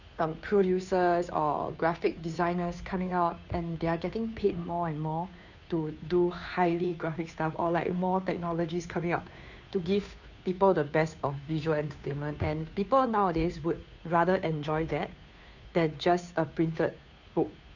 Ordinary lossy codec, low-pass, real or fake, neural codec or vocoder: none; 7.2 kHz; fake; codec, 16 kHz, 2 kbps, FunCodec, trained on Chinese and English, 25 frames a second